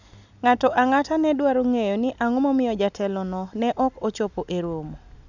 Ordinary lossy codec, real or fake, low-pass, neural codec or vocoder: none; real; 7.2 kHz; none